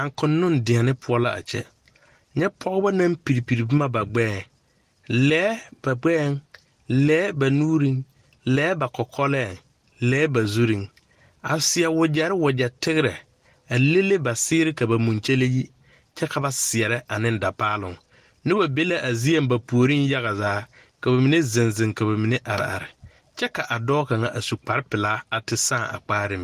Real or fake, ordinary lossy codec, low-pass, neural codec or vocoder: real; Opus, 24 kbps; 14.4 kHz; none